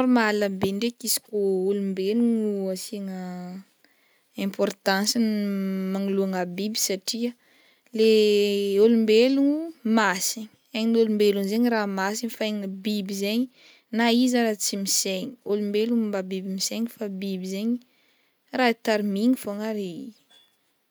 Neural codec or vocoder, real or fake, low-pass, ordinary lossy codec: none; real; none; none